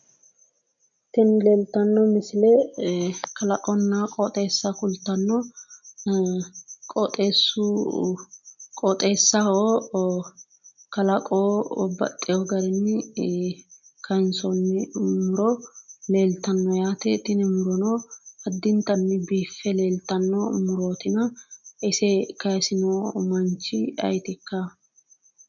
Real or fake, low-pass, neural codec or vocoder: real; 7.2 kHz; none